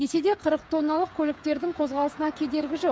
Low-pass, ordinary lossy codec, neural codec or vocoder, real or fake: none; none; codec, 16 kHz, 8 kbps, FreqCodec, smaller model; fake